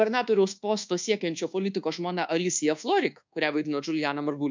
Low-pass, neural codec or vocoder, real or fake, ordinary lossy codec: 7.2 kHz; codec, 24 kHz, 1.2 kbps, DualCodec; fake; MP3, 64 kbps